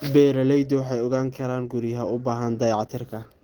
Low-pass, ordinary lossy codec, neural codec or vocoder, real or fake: 19.8 kHz; Opus, 16 kbps; none; real